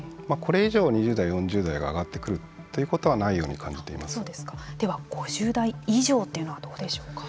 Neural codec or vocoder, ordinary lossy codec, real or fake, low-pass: none; none; real; none